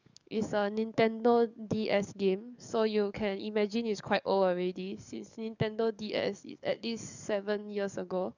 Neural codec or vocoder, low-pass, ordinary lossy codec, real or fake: codec, 44.1 kHz, 7.8 kbps, DAC; 7.2 kHz; none; fake